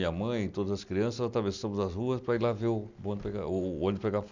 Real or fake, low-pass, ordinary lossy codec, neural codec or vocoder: real; 7.2 kHz; none; none